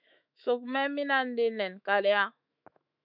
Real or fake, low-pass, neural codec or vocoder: fake; 5.4 kHz; autoencoder, 48 kHz, 128 numbers a frame, DAC-VAE, trained on Japanese speech